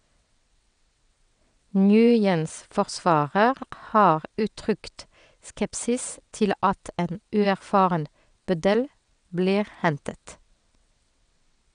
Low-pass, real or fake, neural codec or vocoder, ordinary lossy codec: 9.9 kHz; fake; vocoder, 22.05 kHz, 80 mel bands, WaveNeXt; none